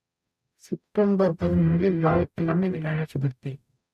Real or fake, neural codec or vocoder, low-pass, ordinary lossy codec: fake; codec, 44.1 kHz, 0.9 kbps, DAC; 14.4 kHz; none